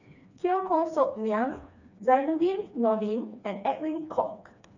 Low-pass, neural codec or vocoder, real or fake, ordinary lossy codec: 7.2 kHz; codec, 16 kHz, 2 kbps, FreqCodec, smaller model; fake; none